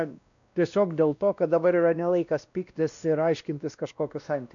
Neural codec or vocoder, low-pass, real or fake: codec, 16 kHz, 1 kbps, X-Codec, WavLM features, trained on Multilingual LibriSpeech; 7.2 kHz; fake